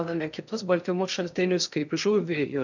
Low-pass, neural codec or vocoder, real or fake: 7.2 kHz; codec, 16 kHz in and 24 kHz out, 0.6 kbps, FocalCodec, streaming, 2048 codes; fake